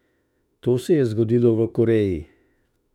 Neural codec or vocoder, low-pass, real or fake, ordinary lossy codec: autoencoder, 48 kHz, 32 numbers a frame, DAC-VAE, trained on Japanese speech; 19.8 kHz; fake; none